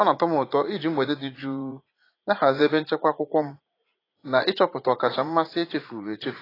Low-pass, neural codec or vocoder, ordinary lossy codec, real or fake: 5.4 kHz; vocoder, 44.1 kHz, 80 mel bands, Vocos; AAC, 24 kbps; fake